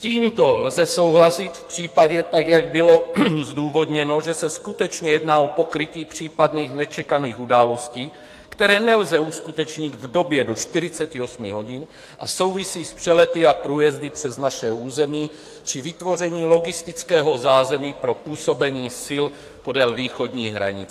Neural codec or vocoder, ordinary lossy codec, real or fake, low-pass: codec, 44.1 kHz, 2.6 kbps, SNAC; AAC, 64 kbps; fake; 14.4 kHz